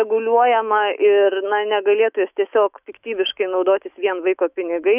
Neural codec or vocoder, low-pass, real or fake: autoencoder, 48 kHz, 128 numbers a frame, DAC-VAE, trained on Japanese speech; 3.6 kHz; fake